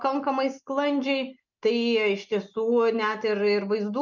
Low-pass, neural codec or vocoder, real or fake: 7.2 kHz; none; real